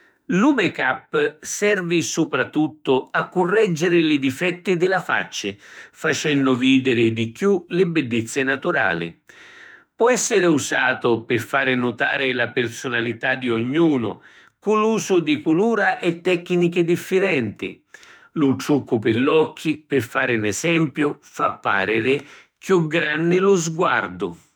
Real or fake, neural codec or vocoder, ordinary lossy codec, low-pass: fake; autoencoder, 48 kHz, 32 numbers a frame, DAC-VAE, trained on Japanese speech; none; none